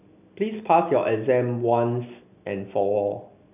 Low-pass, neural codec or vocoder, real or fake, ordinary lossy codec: 3.6 kHz; none; real; none